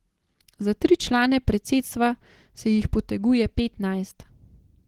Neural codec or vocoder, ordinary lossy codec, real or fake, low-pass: none; Opus, 16 kbps; real; 19.8 kHz